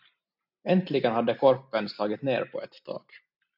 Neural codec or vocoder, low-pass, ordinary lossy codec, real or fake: none; 5.4 kHz; MP3, 48 kbps; real